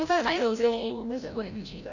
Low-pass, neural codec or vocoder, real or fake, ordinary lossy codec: 7.2 kHz; codec, 16 kHz, 0.5 kbps, FreqCodec, larger model; fake; none